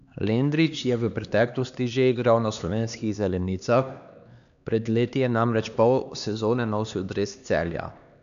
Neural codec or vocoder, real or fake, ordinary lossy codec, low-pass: codec, 16 kHz, 2 kbps, X-Codec, HuBERT features, trained on LibriSpeech; fake; none; 7.2 kHz